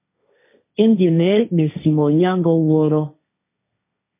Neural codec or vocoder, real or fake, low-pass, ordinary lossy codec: codec, 16 kHz, 1.1 kbps, Voila-Tokenizer; fake; 3.6 kHz; MP3, 24 kbps